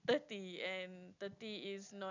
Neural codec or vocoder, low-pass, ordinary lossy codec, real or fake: none; 7.2 kHz; none; real